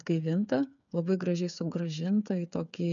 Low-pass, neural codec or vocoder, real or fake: 7.2 kHz; codec, 16 kHz, 8 kbps, FreqCodec, smaller model; fake